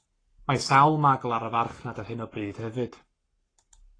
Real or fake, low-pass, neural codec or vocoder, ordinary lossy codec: fake; 9.9 kHz; codec, 44.1 kHz, 7.8 kbps, Pupu-Codec; AAC, 32 kbps